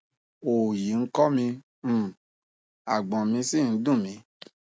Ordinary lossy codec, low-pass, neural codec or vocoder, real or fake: none; none; none; real